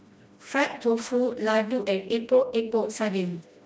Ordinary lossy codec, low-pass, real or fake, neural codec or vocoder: none; none; fake; codec, 16 kHz, 1 kbps, FreqCodec, smaller model